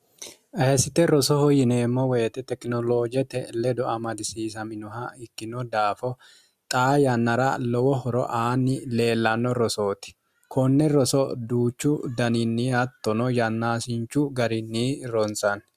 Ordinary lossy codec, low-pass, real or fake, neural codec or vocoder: Opus, 64 kbps; 14.4 kHz; real; none